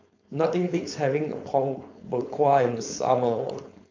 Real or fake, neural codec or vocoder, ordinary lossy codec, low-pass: fake; codec, 16 kHz, 4.8 kbps, FACodec; MP3, 48 kbps; 7.2 kHz